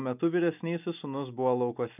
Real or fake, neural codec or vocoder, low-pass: real; none; 3.6 kHz